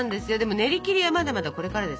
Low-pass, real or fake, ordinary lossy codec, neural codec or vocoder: none; real; none; none